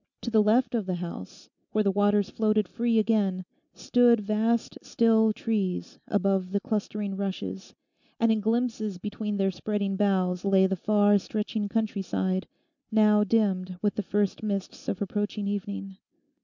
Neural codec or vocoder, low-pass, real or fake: none; 7.2 kHz; real